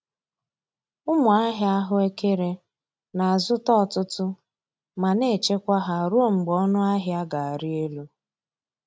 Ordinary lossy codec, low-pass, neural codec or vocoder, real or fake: none; none; none; real